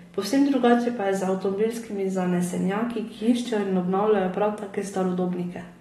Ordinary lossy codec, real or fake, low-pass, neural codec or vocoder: AAC, 32 kbps; real; 19.8 kHz; none